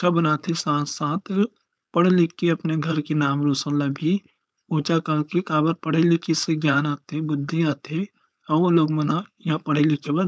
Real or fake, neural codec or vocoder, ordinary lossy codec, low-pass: fake; codec, 16 kHz, 4.8 kbps, FACodec; none; none